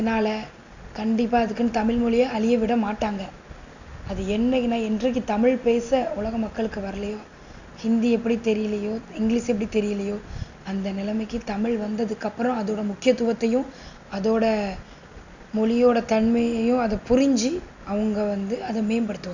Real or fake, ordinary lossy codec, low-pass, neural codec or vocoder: real; none; 7.2 kHz; none